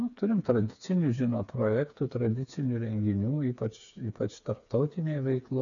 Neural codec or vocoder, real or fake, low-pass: codec, 16 kHz, 4 kbps, FreqCodec, smaller model; fake; 7.2 kHz